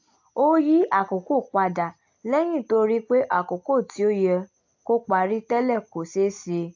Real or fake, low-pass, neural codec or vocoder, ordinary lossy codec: real; 7.2 kHz; none; AAC, 48 kbps